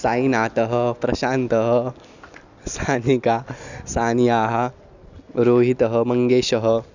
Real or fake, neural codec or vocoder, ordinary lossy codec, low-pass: real; none; none; 7.2 kHz